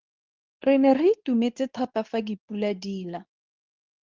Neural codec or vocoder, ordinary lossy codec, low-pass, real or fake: codec, 24 kHz, 0.9 kbps, WavTokenizer, medium speech release version 2; Opus, 32 kbps; 7.2 kHz; fake